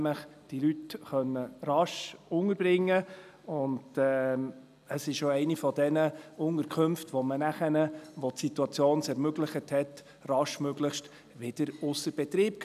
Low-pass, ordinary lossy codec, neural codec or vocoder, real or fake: 14.4 kHz; none; none; real